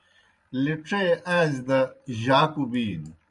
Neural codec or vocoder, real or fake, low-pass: vocoder, 44.1 kHz, 128 mel bands every 512 samples, BigVGAN v2; fake; 10.8 kHz